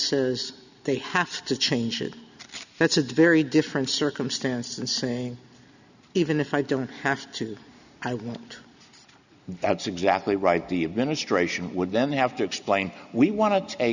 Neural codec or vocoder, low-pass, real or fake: none; 7.2 kHz; real